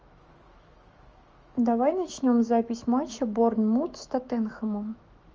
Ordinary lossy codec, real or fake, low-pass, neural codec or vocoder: Opus, 24 kbps; fake; 7.2 kHz; autoencoder, 48 kHz, 128 numbers a frame, DAC-VAE, trained on Japanese speech